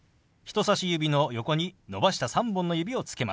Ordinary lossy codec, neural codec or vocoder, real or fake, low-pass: none; none; real; none